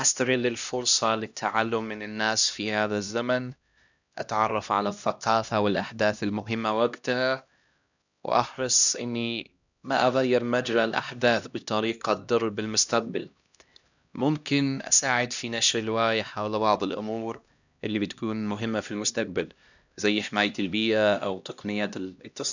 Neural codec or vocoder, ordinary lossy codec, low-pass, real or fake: codec, 16 kHz, 1 kbps, X-Codec, HuBERT features, trained on LibriSpeech; none; 7.2 kHz; fake